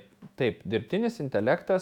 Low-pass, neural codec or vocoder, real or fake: 19.8 kHz; autoencoder, 48 kHz, 128 numbers a frame, DAC-VAE, trained on Japanese speech; fake